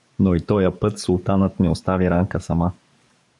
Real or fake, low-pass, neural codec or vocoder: fake; 10.8 kHz; codec, 44.1 kHz, 7.8 kbps, DAC